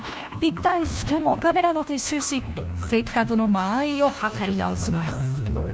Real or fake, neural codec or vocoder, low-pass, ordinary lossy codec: fake; codec, 16 kHz, 1 kbps, FunCodec, trained on LibriTTS, 50 frames a second; none; none